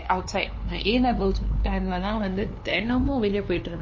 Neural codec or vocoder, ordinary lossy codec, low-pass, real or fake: codec, 16 kHz, 2 kbps, FunCodec, trained on LibriTTS, 25 frames a second; MP3, 32 kbps; 7.2 kHz; fake